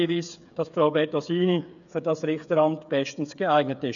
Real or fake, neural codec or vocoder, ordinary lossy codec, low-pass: fake; codec, 16 kHz, 8 kbps, FreqCodec, smaller model; none; 7.2 kHz